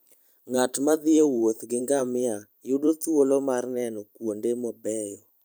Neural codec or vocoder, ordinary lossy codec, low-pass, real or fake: vocoder, 44.1 kHz, 128 mel bands every 512 samples, BigVGAN v2; none; none; fake